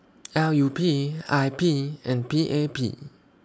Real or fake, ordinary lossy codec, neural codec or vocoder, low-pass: real; none; none; none